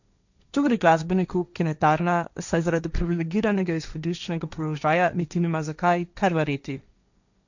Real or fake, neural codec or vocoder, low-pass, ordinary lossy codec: fake; codec, 16 kHz, 1.1 kbps, Voila-Tokenizer; 7.2 kHz; none